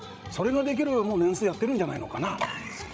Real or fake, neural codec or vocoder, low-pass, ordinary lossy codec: fake; codec, 16 kHz, 16 kbps, FreqCodec, larger model; none; none